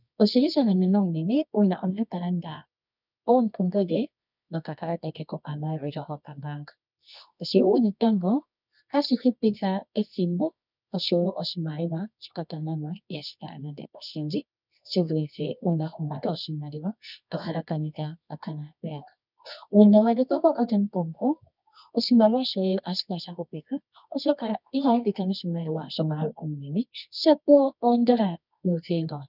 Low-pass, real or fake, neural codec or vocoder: 5.4 kHz; fake; codec, 24 kHz, 0.9 kbps, WavTokenizer, medium music audio release